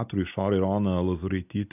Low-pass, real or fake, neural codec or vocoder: 3.6 kHz; real; none